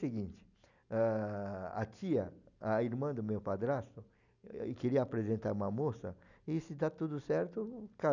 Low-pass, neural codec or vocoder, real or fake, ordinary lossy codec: 7.2 kHz; none; real; none